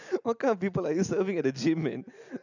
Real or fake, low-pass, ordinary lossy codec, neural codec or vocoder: real; 7.2 kHz; none; none